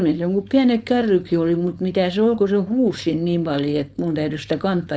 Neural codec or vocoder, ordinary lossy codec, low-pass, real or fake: codec, 16 kHz, 4.8 kbps, FACodec; none; none; fake